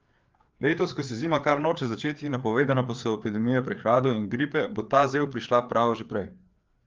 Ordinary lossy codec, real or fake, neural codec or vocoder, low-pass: Opus, 32 kbps; fake; codec, 16 kHz, 4 kbps, FreqCodec, larger model; 7.2 kHz